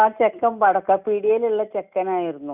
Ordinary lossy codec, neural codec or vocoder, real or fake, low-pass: none; none; real; 3.6 kHz